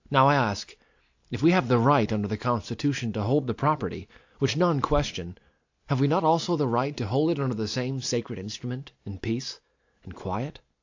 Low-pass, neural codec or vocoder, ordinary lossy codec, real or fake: 7.2 kHz; none; AAC, 48 kbps; real